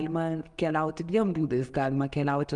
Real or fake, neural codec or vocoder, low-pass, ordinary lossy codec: real; none; 10.8 kHz; Opus, 32 kbps